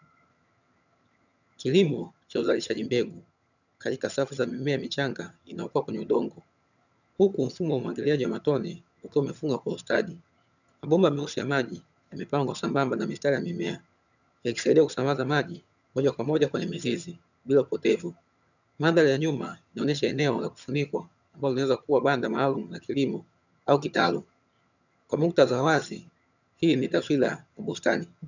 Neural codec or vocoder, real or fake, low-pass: vocoder, 22.05 kHz, 80 mel bands, HiFi-GAN; fake; 7.2 kHz